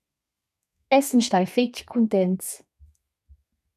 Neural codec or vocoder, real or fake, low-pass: codec, 32 kHz, 1.9 kbps, SNAC; fake; 14.4 kHz